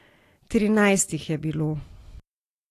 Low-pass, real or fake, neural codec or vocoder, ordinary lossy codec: 14.4 kHz; real; none; AAC, 48 kbps